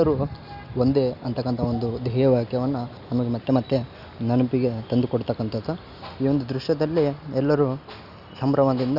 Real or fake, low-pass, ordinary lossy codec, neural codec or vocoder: real; 5.4 kHz; none; none